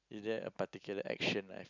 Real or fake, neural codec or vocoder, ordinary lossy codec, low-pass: real; none; none; 7.2 kHz